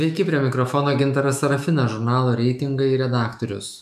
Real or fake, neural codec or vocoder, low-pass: fake; autoencoder, 48 kHz, 128 numbers a frame, DAC-VAE, trained on Japanese speech; 14.4 kHz